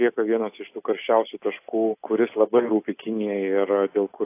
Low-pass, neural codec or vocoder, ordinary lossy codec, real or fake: 3.6 kHz; none; AAC, 24 kbps; real